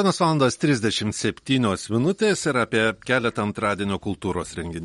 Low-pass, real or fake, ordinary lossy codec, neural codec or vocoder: 19.8 kHz; real; MP3, 48 kbps; none